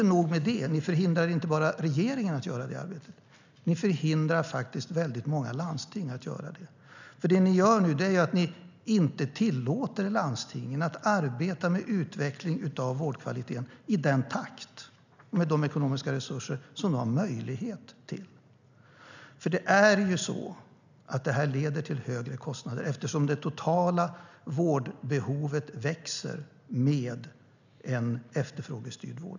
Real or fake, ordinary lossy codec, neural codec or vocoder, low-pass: real; none; none; 7.2 kHz